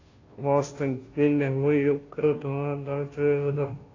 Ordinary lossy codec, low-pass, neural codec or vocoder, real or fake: AAC, 32 kbps; 7.2 kHz; codec, 16 kHz, 0.5 kbps, FunCodec, trained on Chinese and English, 25 frames a second; fake